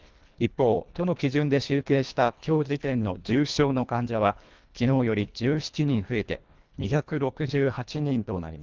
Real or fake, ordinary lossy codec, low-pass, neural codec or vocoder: fake; Opus, 24 kbps; 7.2 kHz; codec, 24 kHz, 1.5 kbps, HILCodec